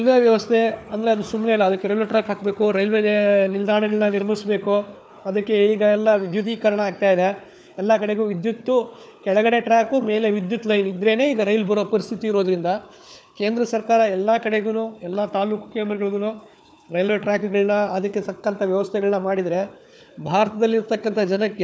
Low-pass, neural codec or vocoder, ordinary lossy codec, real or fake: none; codec, 16 kHz, 4 kbps, FunCodec, trained on Chinese and English, 50 frames a second; none; fake